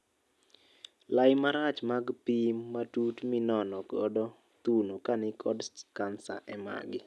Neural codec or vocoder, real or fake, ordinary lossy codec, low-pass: none; real; none; none